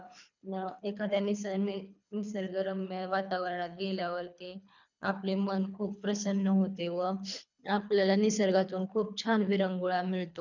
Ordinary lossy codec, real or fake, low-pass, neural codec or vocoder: none; fake; 7.2 kHz; codec, 24 kHz, 3 kbps, HILCodec